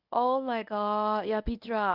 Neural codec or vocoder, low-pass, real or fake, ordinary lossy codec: codec, 24 kHz, 0.9 kbps, WavTokenizer, medium speech release version 1; 5.4 kHz; fake; none